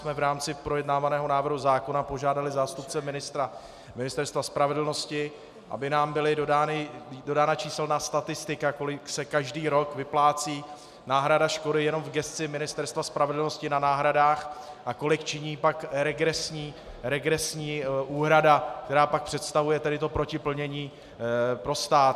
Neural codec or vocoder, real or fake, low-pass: none; real; 14.4 kHz